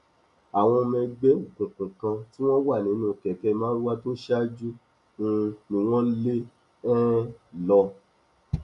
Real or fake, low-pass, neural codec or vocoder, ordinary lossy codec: real; 10.8 kHz; none; none